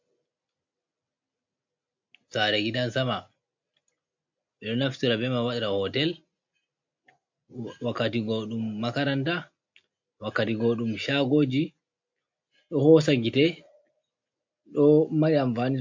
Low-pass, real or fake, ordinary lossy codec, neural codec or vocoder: 7.2 kHz; real; MP3, 48 kbps; none